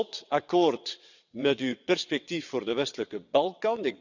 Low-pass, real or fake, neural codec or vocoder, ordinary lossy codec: 7.2 kHz; fake; vocoder, 22.05 kHz, 80 mel bands, WaveNeXt; none